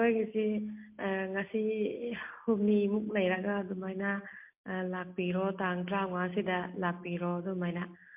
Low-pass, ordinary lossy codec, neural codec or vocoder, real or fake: 3.6 kHz; MP3, 32 kbps; none; real